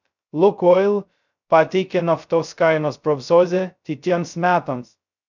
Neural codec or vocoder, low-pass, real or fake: codec, 16 kHz, 0.2 kbps, FocalCodec; 7.2 kHz; fake